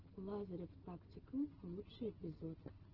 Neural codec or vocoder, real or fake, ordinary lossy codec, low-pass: none; real; Opus, 16 kbps; 5.4 kHz